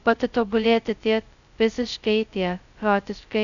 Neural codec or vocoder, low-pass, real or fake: codec, 16 kHz, 0.2 kbps, FocalCodec; 7.2 kHz; fake